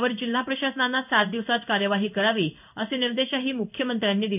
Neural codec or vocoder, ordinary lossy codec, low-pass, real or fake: codec, 16 kHz in and 24 kHz out, 1 kbps, XY-Tokenizer; none; 3.6 kHz; fake